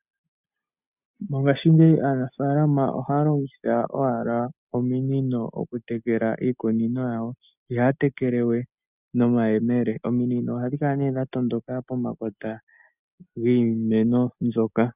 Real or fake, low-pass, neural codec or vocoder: real; 3.6 kHz; none